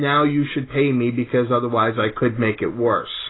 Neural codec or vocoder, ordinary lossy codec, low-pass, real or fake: none; AAC, 16 kbps; 7.2 kHz; real